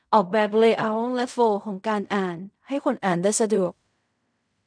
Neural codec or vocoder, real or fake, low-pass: codec, 16 kHz in and 24 kHz out, 0.4 kbps, LongCat-Audio-Codec, fine tuned four codebook decoder; fake; 9.9 kHz